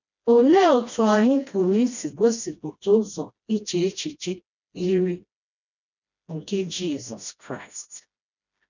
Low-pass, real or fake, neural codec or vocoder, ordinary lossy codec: 7.2 kHz; fake; codec, 16 kHz, 1 kbps, FreqCodec, smaller model; none